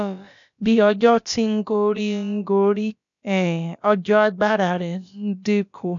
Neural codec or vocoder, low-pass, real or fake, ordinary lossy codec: codec, 16 kHz, about 1 kbps, DyCAST, with the encoder's durations; 7.2 kHz; fake; none